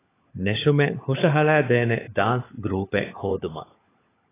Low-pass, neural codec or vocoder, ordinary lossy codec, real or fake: 3.6 kHz; codec, 16 kHz, 16 kbps, FunCodec, trained on LibriTTS, 50 frames a second; AAC, 16 kbps; fake